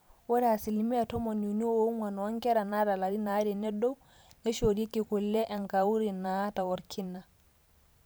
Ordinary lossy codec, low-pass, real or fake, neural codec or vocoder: none; none; fake; vocoder, 44.1 kHz, 128 mel bands every 512 samples, BigVGAN v2